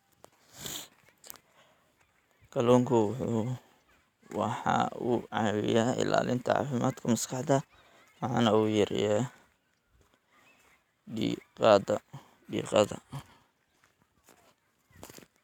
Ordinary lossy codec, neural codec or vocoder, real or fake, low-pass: none; none; real; 19.8 kHz